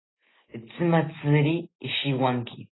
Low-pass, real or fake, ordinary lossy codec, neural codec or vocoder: 7.2 kHz; real; AAC, 16 kbps; none